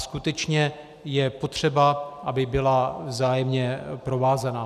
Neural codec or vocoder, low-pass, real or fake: none; 14.4 kHz; real